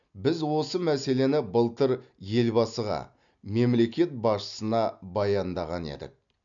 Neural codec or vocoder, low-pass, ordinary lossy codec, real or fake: none; 7.2 kHz; none; real